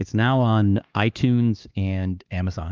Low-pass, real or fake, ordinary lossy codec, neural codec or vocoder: 7.2 kHz; fake; Opus, 24 kbps; codec, 16 kHz, 2 kbps, X-Codec, HuBERT features, trained on LibriSpeech